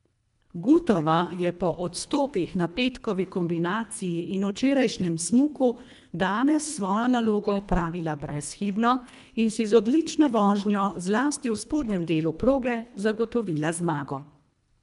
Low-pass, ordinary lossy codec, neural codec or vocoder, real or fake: 10.8 kHz; MP3, 96 kbps; codec, 24 kHz, 1.5 kbps, HILCodec; fake